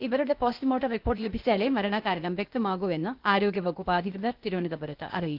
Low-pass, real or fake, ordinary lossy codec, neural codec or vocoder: 5.4 kHz; fake; Opus, 24 kbps; codec, 16 kHz, 0.8 kbps, ZipCodec